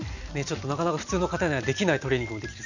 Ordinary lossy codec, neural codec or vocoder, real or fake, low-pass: none; none; real; 7.2 kHz